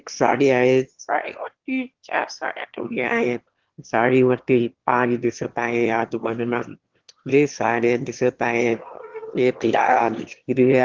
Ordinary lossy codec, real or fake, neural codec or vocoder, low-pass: Opus, 16 kbps; fake; autoencoder, 22.05 kHz, a latent of 192 numbers a frame, VITS, trained on one speaker; 7.2 kHz